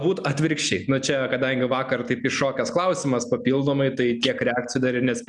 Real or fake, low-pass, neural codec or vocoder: real; 10.8 kHz; none